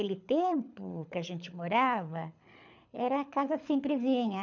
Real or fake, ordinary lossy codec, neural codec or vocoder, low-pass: fake; none; codec, 24 kHz, 6 kbps, HILCodec; 7.2 kHz